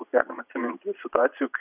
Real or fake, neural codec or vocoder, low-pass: fake; vocoder, 22.05 kHz, 80 mel bands, Vocos; 3.6 kHz